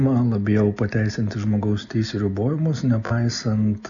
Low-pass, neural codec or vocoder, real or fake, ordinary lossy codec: 7.2 kHz; none; real; MP3, 96 kbps